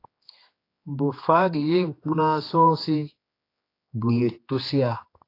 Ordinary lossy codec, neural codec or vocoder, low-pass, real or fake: AAC, 32 kbps; codec, 16 kHz, 2 kbps, X-Codec, HuBERT features, trained on general audio; 5.4 kHz; fake